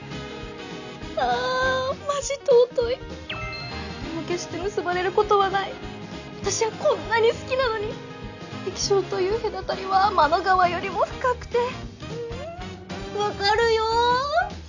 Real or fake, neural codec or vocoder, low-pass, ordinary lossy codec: real; none; 7.2 kHz; none